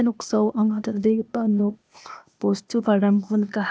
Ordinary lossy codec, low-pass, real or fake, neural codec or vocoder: none; none; fake; codec, 16 kHz, 0.8 kbps, ZipCodec